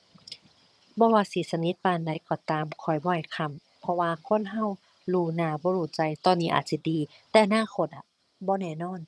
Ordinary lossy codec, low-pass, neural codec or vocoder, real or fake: none; none; vocoder, 22.05 kHz, 80 mel bands, HiFi-GAN; fake